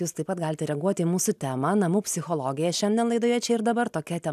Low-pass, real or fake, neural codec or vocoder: 14.4 kHz; real; none